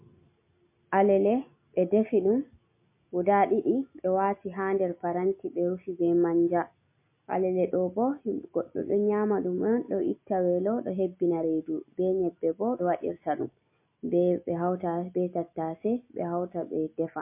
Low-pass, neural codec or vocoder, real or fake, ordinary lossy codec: 3.6 kHz; none; real; MP3, 24 kbps